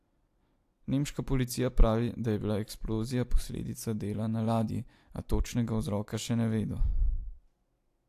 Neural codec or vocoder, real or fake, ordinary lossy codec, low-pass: none; real; AAC, 64 kbps; 14.4 kHz